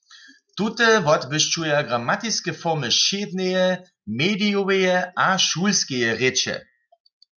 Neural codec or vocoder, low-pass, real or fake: none; 7.2 kHz; real